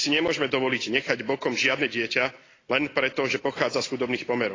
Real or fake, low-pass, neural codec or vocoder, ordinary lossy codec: real; 7.2 kHz; none; AAC, 32 kbps